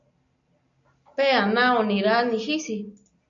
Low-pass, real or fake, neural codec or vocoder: 7.2 kHz; real; none